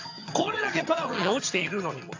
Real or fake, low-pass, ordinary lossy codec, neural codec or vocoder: fake; 7.2 kHz; AAC, 48 kbps; vocoder, 22.05 kHz, 80 mel bands, HiFi-GAN